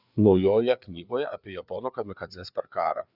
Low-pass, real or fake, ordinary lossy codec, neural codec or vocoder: 5.4 kHz; fake; Opus, 64 kbps; codec, 16 kHz, 4 kbps, FreqCodec, larger model